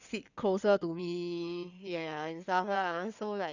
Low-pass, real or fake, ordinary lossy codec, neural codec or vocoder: 7.2 kHz; fake; none; codec, 16 kHz in and 24 kHz out, 2.2 kbps, FireRedTTS-2 codec